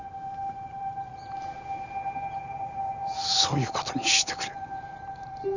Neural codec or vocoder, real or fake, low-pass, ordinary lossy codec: none; real; 7.2 kHz; none